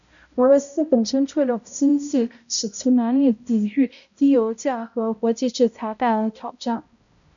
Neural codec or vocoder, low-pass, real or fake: codec, 16 kHz, 0.5 kbps, X-Codec, HuBERT features, trained on balanced general audio; 7.2 kHz; fake